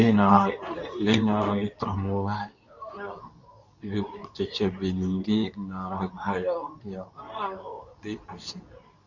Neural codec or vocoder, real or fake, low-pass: codec, 24 kHz, 0.9 kbps, WavTokenizer, medium speech release version 2; fake; 7.2 kHz